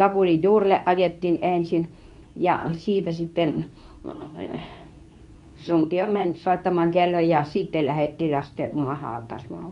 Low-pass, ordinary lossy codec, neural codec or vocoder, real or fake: 10.8 kHz; none; codec, 24 kHz, 0.9 kbps, WavTokenizer, medium speech release version 2; fake